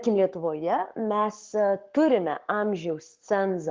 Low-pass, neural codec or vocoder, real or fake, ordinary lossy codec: 7.2 kHz; codec, 44.1 kHz, 7.8 kbps, Pupu-Codec; fake; Opus, 16 kbps